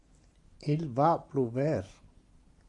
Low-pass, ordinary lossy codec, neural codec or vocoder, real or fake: 10.8 kHz; AAC, 64 kbps; none; real